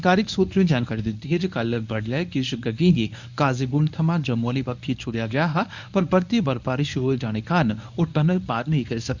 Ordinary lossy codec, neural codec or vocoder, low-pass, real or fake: none; codec, 24 kHz, 0.9 kbps, WavTokenizer, medium speech release version 1; 7.2 kHz; fake